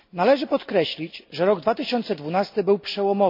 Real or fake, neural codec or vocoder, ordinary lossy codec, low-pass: real; none; MP3, 48 kbps; 5.4 kHz